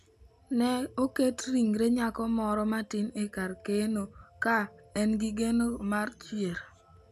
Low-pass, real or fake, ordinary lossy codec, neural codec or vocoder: 14.4 kHz; real; none; none